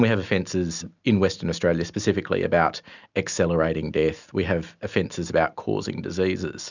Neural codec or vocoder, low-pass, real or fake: none; 7.2 kHz; real